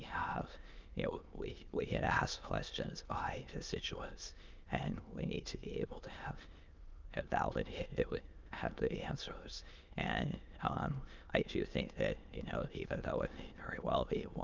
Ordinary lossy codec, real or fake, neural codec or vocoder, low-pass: Opus, 24 kbps; fake; autoencoder, 22.05 kHz, a latent of 192 numbers a frame, VITS, trained on many speakers; 7.2 kHz